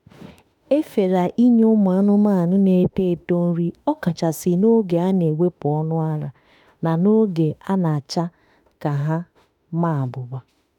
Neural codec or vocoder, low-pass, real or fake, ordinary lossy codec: autoencoder, 48 kHz, 32 numbers a frame, DAC-VAE, trained on Japanese speech; 19.8 kHz; fake; none